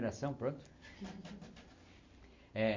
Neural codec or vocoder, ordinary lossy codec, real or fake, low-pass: none; MP3, 48 kbps; real; 7.2 kHz